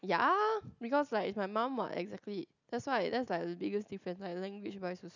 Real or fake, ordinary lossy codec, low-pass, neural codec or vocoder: real; none; 7.2 kHz; none